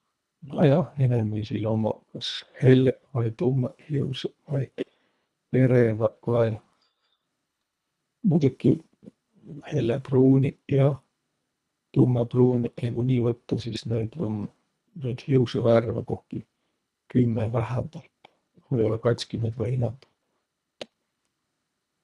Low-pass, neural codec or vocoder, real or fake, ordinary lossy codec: none; codec, 24 kHz, 1.5 kbps, HILCodec; fake; none